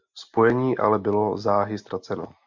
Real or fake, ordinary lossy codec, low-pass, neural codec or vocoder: real; MP3, 64 kbps; 7.2 kHz; none